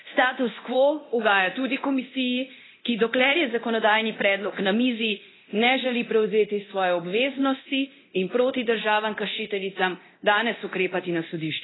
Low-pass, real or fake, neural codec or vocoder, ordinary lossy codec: 7.2 kHz; fake; codec, 24 kHz, 0.9 kbps, DualCodec; AAC, 16 kbps